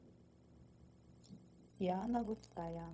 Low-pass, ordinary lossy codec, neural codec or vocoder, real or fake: none; none; codec, 16 kHz, 0.4 kbps, LongCat-Audio-Codec; fake